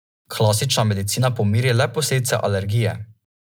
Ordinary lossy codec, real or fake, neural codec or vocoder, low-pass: none; real; none; none